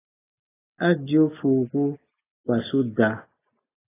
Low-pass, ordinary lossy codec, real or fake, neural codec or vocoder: 3.6 kHz; AAC, 16 kbps; real; none